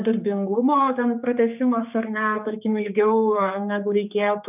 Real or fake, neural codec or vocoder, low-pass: fake; codec, 16 kHz, 4 kbps, X-Codec, HuBERT features, trained on general audio; 3.6 kHz